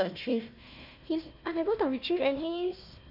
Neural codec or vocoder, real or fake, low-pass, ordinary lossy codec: codec, 16 kHz, 1 kbps, FunCodec, trained on Chinese and English, 50 frames a second; fake; 5.4 kHz; none